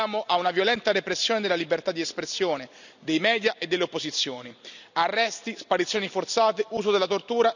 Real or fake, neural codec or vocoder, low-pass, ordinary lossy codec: real; none; 7.2 kHz; none